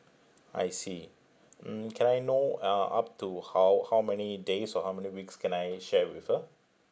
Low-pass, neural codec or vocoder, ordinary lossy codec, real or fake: none; none; none; real